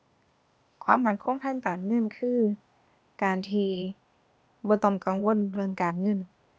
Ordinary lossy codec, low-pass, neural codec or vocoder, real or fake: none; none; codec, 16 kHz, 0.8 kbps, ZipCodec; fake